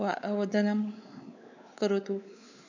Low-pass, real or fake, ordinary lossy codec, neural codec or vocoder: 7.2 kHz; fake; none; codec, 16 kHz, 4 kbps, X-Codec, WavLM features, trained on Multilingual LibriSpeech